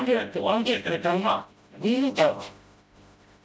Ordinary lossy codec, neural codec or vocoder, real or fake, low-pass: none; codec, 16 kHz, 0.5 kbps, FreqCodec, smaller model; fake; none